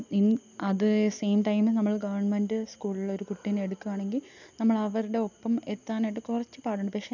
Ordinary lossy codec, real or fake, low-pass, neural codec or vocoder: none; real; 7.2 kHz; none